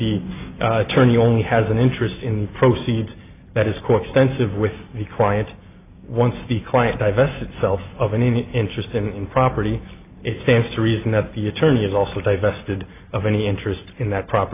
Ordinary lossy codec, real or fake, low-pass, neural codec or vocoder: AAC, 24 kbps; real; 3.6 kHz; none